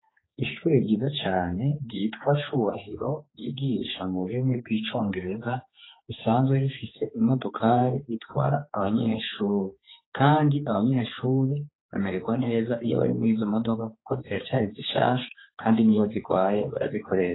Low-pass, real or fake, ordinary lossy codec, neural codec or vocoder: 7.2 kHz; fake; AAC, 16 kbps; codec, 16 kHz, 4 kbps, X-Codec, HuBERT features, trained on general audio